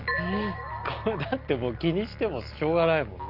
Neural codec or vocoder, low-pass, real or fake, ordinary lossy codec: none; 5.4 kHz; real; Opus, 24 kbps